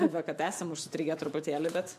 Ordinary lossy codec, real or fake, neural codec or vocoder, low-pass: MP3, 64 kbps; fake; autoencoder, 48 kHz, 128 numbers a frame, DAC-VAE, trained on Japanese speech; 14.4 kHz